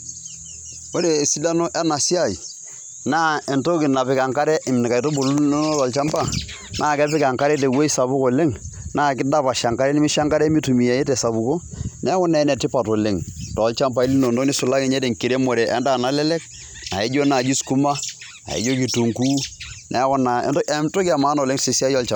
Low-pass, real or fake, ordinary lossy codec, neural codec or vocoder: 19.8 kHz; real; none; none